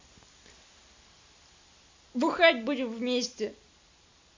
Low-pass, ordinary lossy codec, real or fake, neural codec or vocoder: 7.2 kHz; MP3, 48 kbps; real; none